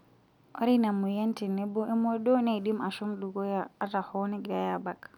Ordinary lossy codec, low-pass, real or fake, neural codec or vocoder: none; 19.8 kHz; real; none